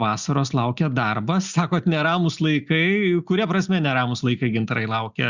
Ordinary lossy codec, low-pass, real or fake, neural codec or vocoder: Opus, 64 kbps; 7.2 kHz; real; none